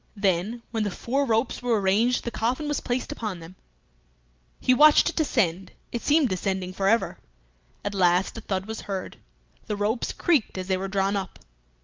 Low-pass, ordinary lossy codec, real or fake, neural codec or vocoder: 7.2 kHz; Opus, 24 kbps; real; none